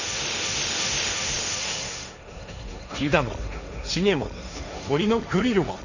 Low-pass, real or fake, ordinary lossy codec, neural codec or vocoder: 7.2 kHz; fake; none; codec, 16 kHz, 1.1 kbps, Voila-Tokenizer